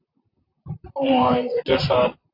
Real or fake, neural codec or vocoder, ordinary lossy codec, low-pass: fake; vocoder, 44.1 kHz, 128 mel bands, Pupu-Vocoder; AAC, 32 kbps; 5.4 kHz